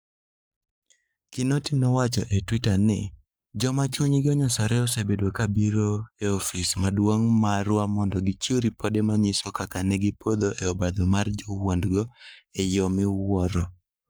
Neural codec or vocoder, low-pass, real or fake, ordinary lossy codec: codec, 44.1 kHz, 7.8 kbps, Pupu-Codec; none; fake; none